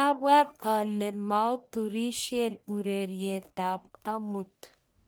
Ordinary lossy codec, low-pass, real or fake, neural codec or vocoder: none; none; fake; codec, 44.1 kHz, 1.7 kbps, Pupu-Codec